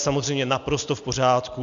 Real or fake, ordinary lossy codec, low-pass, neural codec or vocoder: real; MP3, 64 kbps; 7.2 kHz; none